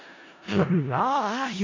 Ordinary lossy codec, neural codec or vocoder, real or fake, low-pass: AAC, 32 kbps; codec, 16 kHz in and 24 kHz out, 0.4 kbps, LongCat-Audio-Codec, four codebook decoder; fake; 7.2 kHz